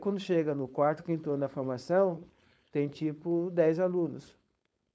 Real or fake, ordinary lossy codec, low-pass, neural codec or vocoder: fake; none; none; codec, 16 kHz, 4.8 kbps, FACodec